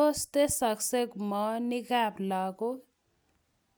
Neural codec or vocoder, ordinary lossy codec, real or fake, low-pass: none; none; real; none